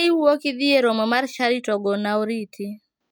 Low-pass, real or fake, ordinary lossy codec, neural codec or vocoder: none; real; none; none